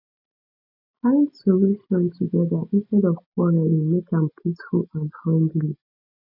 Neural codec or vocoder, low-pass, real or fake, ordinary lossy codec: none; 5.4 kHz; real; none